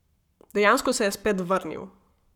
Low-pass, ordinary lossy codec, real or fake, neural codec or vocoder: 19.8 kHz; none; fake; codec, 44.1 kHz, 7.8 kbps, Pupu-Codec